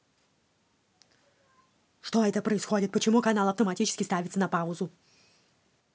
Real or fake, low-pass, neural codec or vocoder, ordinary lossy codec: real; none; none; none